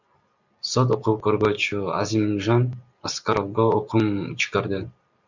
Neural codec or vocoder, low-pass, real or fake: none; 7.2 kHz; real